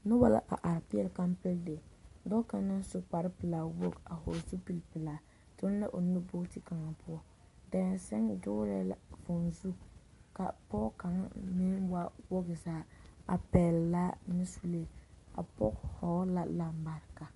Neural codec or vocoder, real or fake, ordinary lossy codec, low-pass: none; real; MP3, 48 kbps; 14.4 kHz